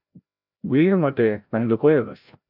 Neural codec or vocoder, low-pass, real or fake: codec, 16 kHz, 0.5 kbps, FreqCodec, larger model; 5.4 kHz; fake